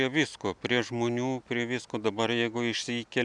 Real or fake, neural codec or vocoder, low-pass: real; none; 10.8 kHz